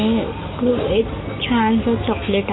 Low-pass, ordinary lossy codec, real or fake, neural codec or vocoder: 7.2 kHz; AAC, 16 kbps; real; none